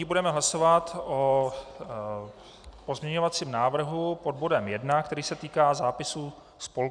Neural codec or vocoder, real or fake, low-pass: none; real; 9.9 kHz